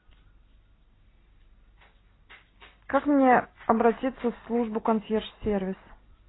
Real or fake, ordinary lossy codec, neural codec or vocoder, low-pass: real; AAC, 16 kbps; none; 7.2 kHz